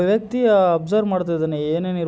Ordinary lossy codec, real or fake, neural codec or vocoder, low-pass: none; real; none; none